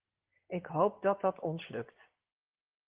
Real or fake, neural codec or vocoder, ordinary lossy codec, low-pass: fake; vocoder, 24 kHz, 100 mel bands, Vocos; Opus, 16 kbps; 3.6 kHz